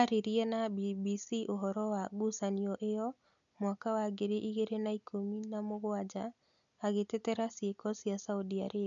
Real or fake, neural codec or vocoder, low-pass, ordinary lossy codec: real; none; 7.2 kHz; none